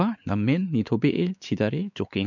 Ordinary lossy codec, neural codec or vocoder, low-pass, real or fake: none; codec, 16 kHz, 4 kbps, X-Codec, WavLM features, trained on Multilingual LibriSpeech; 7.2 kHz; fake